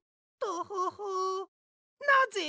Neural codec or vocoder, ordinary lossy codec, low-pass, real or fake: none; none; none; real